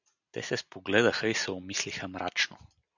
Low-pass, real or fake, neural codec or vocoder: 7.2 kHz; real; none